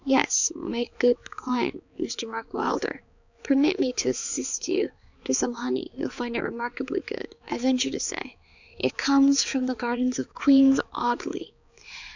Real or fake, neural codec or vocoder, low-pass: fake; codec, 16 kHz, 4 kbps, X-Codec, HuBERT features, trained on balanced general audio; 7.2 kHz